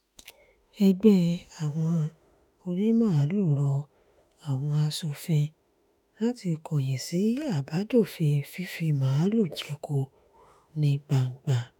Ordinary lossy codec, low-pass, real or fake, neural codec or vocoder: none; 19.8 kHz; fake; autoencoder, 48 kHz, 32 numbers a frame, DAC-VAE, trained on Japanese speech